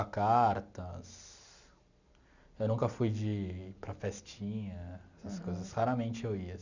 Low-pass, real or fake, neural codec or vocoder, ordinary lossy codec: 7.2 kHz; real; none; none